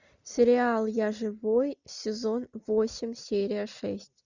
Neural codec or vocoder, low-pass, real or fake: none; 7.2 kHz; real